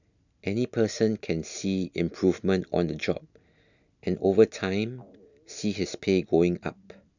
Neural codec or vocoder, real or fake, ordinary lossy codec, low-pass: none; real; none; 7.2 kHz